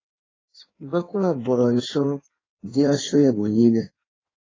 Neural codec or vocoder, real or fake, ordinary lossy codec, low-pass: codec, 16 kHz in and 24 kHz out, 1.1 kbps, FireRedTTS-2 codec; fake; AAC, 32 kbps; 7.2 kHz